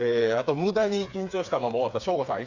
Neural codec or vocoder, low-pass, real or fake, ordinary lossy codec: codec, 16 kHz, 4 kbps, FreqCodec, smaller model; 7.2 kHz; fake; Opus, 64 kbps